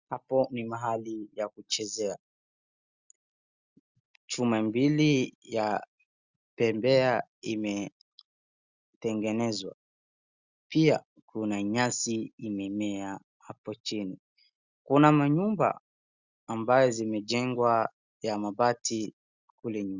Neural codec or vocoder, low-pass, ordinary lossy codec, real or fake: none; 7.2 kHz; Opus, 64 kbps; real